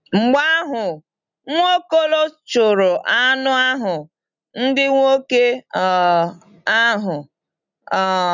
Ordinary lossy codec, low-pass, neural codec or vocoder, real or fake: none; 7.2 kHz; none; real